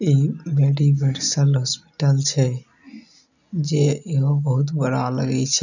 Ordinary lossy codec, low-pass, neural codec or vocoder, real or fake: none; 7.2 kHz; none; real